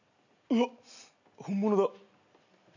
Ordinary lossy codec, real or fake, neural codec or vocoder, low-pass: none; real; none; 7.2 kHz